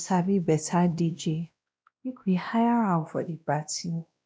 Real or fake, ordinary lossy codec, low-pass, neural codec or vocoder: fake; none; none; codec, 16 kHz, 1 kbps, X-Codec, WavLM features, trained on Multilingual LibriSpeech